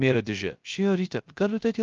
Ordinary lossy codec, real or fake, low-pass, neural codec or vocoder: Opus, 24 kbps; fake; 7.2 kHz; codec, 16 kHz, 0.3 kbps, FocalCodec